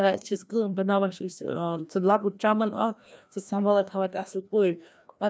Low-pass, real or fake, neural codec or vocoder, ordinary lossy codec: none; fake; codec, 16 kHz, 1 kbps, FreqCodec, larger model; none